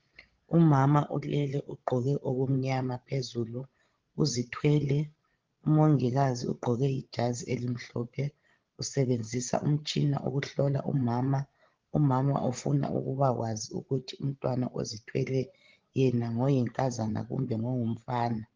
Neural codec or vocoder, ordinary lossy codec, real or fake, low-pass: codec, 16 kHz, 16 kbps, FreqCodec, larger model; Opus, 16 kbps; fake; 7.2 kHz